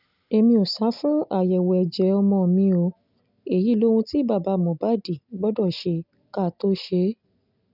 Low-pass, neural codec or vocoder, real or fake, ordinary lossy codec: 5.4 kHz; none; real; none